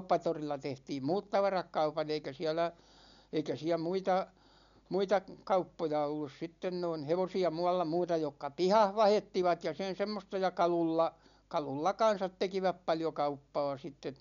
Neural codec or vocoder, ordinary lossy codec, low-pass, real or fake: none; none; 7.2 kHz; real